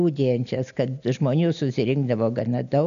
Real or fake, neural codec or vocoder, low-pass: real; none; 7.2 kHz